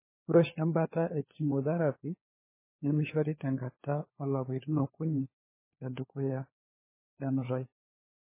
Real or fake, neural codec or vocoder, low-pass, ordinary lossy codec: fake; codec, 16 kHz, 4 kbps, FunCodec, trained on LibriTTS, 50 frames a second; 3.6 kHz; MP3, 16 kbps